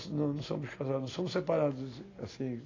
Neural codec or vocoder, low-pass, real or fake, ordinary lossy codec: none; 7.2 kHz; real; AAC, 32 kbps